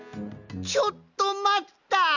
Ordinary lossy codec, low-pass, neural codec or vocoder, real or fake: none; 7.2 kHz; none; real